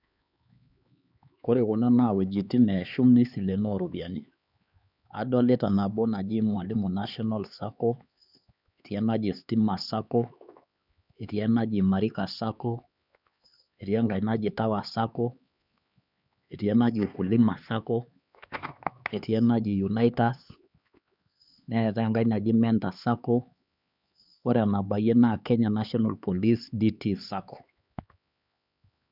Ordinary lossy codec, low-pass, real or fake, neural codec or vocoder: none; 5.4 kHz; fake; codec, 16 kHz, 4 kbps, X-Codec, HuBERT features, trained on LibriSpeech